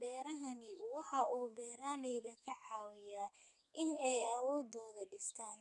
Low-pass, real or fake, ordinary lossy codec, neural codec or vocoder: 10.8 kHz; fake; none; codec, 32 kHz, 1.9 kbps, SNAC